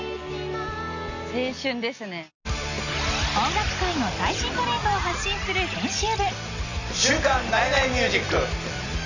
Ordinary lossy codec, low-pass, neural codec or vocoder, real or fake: none; 7.2 kHz; none; real